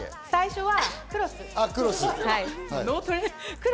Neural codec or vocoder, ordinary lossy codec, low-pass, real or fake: none; none; none; real